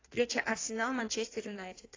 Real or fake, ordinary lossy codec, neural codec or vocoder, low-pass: fake; AAC, 32 kbps; codec, 16 kHz in and 24 kHz out, 1.1 kbps, FireRedTTS-2 codec; 7.2 kHz